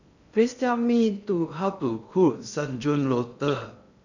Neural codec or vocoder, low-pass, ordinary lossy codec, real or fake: codec, 16 kHz in and 24 kHz out, 0.6 kbps, FocalCodec, streaming, 2048 codes; 7.2 kHz; none; fake